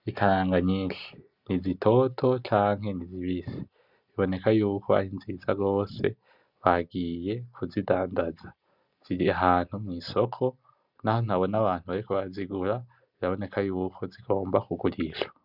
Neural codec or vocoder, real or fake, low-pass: none; real; 5.4 kHz